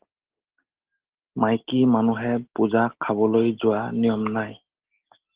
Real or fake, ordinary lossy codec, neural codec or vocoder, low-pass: real; Opus, 16 kbps; none; 3.6 kHz